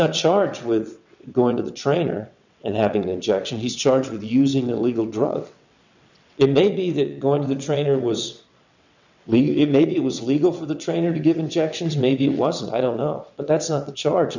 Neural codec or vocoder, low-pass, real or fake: vocoder, 22.05 kHz, 80 mel bands, WaveNeXt; 7.2 kHz; fake